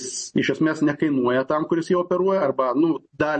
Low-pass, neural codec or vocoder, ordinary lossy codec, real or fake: 10.8 kHz; none; MP3, 32 kbps; real